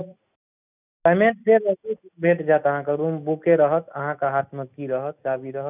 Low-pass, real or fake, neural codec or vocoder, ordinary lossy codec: 3.6 kHz; real; none; none